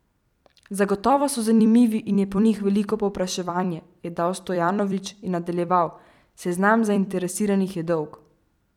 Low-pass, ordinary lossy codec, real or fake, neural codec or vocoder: 19.8 kHz; none; fake; vocoder, 44.1 kHz, 128 mel bands every 256 samples, BigVGAN v2